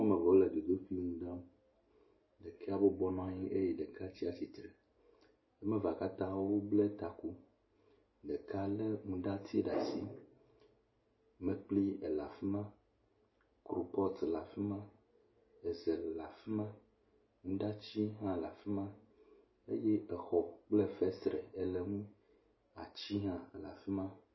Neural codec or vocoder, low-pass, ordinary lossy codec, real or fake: none; 7.2 kHz; MP3, 24 kbps; real